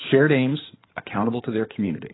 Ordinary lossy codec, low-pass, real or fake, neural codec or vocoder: AAC, 16 kbps; 7.2 kHz; fake; codec, 16 kHz in and 24 kHz out, 2.2 kbps, FireRedTTS-2 codec